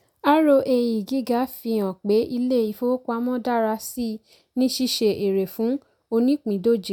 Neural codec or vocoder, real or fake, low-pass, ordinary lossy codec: none; real; 19.8 kHz; none